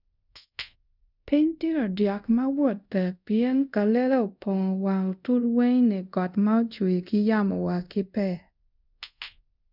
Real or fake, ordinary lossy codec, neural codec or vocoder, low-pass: fake; none; codec, 24 kHz, 0.5 kbps, DualCodec; 5.4 kHz